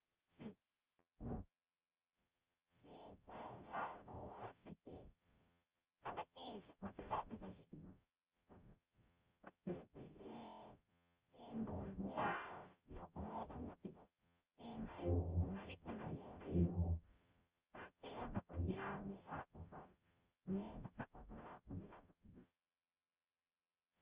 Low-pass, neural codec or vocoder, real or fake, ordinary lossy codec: 3.6 kHz; codec, 44.1 kHz, 0.9 kbps, DAC; fake; Opus, 24 kbps